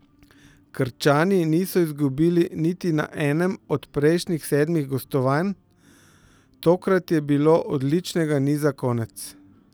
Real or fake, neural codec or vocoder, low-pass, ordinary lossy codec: real; none; none; none